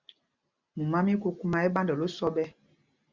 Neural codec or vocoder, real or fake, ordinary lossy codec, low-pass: none; real; Opus, 64 kbps; 7.2 kHz